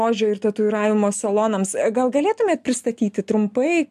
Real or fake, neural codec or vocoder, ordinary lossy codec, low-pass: real; none; MP3, 96 kbps; 14.4 kHz